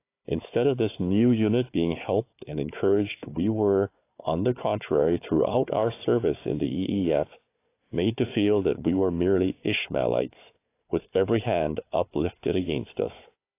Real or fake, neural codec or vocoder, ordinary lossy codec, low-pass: fake; codec, 16 kHz, 4 kbps, FunCodec, trained on Chinese and English, 50 frames a second; AAC, 24 kbps; 3.6 kHz